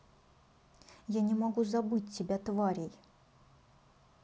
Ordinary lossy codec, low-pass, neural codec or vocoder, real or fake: none; none; none; real